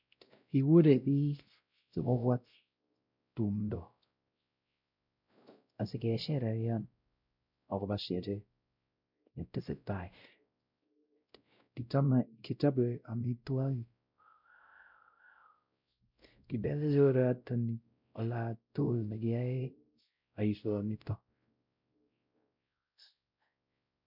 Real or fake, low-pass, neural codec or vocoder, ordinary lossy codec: fake; 5.4 kHz; codec, 16 kHz, 0.5 kbps, X-Codec, WavLM features, trained on Multilingual LibriSpeech; none